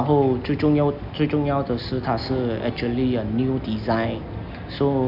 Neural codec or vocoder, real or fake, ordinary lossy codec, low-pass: none; real; none; 5.4 kHz